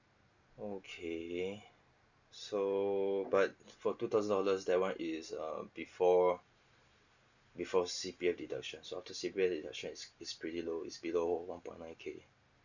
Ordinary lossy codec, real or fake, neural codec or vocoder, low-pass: none; real; none; 7.2 kHz